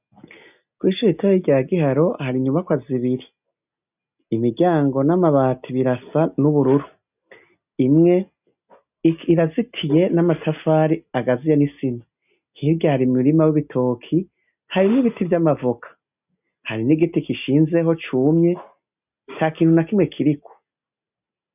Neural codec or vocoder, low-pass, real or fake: none; 3.6 kHz; real